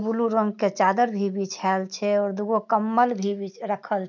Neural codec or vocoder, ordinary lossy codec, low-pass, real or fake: none; none; 7.2 kHz; real